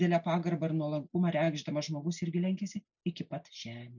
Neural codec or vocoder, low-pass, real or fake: none; 7.2 kHz; real